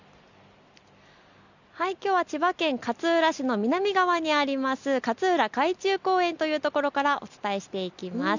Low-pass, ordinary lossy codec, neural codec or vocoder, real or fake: 7.2 kHz; none; none; real